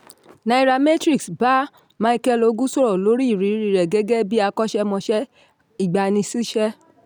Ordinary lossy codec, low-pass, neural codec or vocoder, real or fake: none; none; none; real